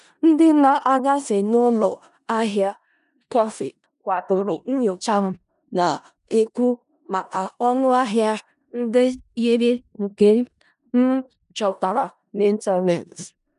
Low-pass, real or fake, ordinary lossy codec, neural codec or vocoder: 10.8 kHz; fake; none; codec, 16 kHz in and 24 kHz out, 0.4 kbps, LongCat-Audio-Codec, four codebook decoder